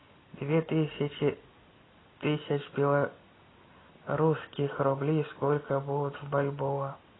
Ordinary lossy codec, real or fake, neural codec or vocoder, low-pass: AAC, 16 kbps; real; none; 7.2 kHz